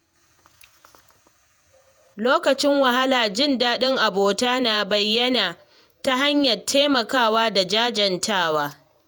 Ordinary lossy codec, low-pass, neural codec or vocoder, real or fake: none; none; vocoder, 48 kHz, 128 mel bands, Vocos; fake